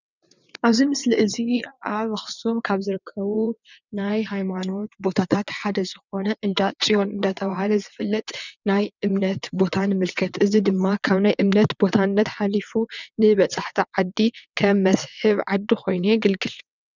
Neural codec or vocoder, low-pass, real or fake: vocoder, 22.05 kHz, 80 mel bands, WaveNeXt; 7.2 kHz; fake